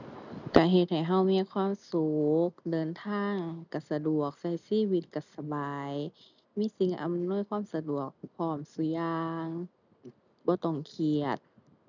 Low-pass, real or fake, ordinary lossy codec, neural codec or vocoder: 7.2 kHz; real; none; none